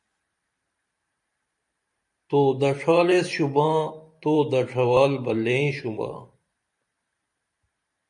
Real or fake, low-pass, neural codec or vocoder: fake; 10.8 kHz; vocoder, 44.1 kHz, 128 mel bands every 512 samples, BigVGAN v2